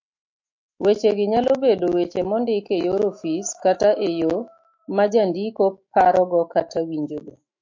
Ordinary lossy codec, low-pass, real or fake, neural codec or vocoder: MP3, 48 kbps; 7.2 kHz; fake; autoencoder, 48 kHz, 128 numbers a frame, DAC-VAE, trained on Japanese speech